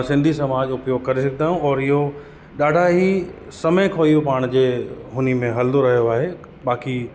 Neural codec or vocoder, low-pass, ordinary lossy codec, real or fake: none; none; none; real